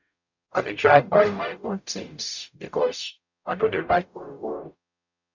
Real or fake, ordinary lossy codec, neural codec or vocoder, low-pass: fake; none; codec, 44.1 kHz, 0.9 kbps, DAC; 7.2 kHz